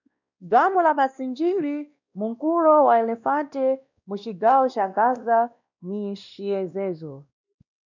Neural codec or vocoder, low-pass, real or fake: codec, 16 kHz, 1 kbps, X-Codec, WavLM features, trained on Multilingual LibriSpeech; 7.2 kHz; fake